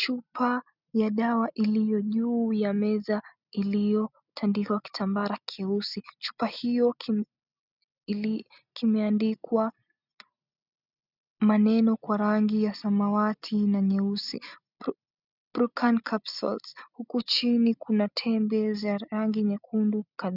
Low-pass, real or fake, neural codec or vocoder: 5.4 kHz; real; none